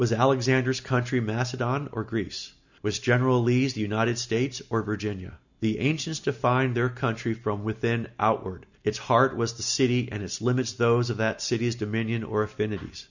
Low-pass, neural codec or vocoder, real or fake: 7.2 kHz; none; real